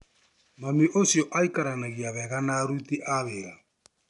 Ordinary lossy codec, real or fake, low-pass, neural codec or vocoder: none; real; 10.8 kHz; none